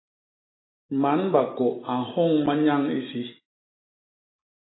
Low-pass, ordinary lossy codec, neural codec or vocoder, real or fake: 7.2 kHz; AAC, 16 kbps; none; real